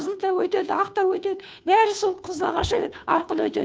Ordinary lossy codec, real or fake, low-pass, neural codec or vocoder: none; fake; none; codec, 16 kHz, 2 kbps, FunCodec, trained on Chinese and English, 25 frames a second